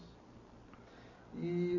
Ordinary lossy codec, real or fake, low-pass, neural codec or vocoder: MP3, 32 kbps; real; 7.2 kHz; none